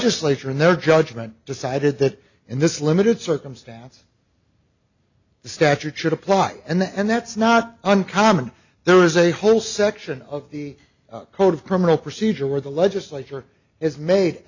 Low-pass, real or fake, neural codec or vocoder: 7.2 kHz; real; none